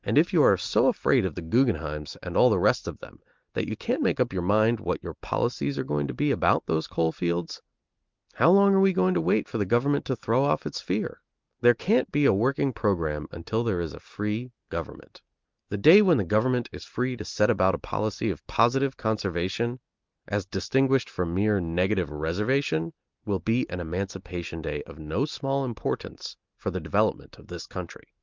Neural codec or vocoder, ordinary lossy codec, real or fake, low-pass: none; Opus, 32 kbps; real; 7.2 kHz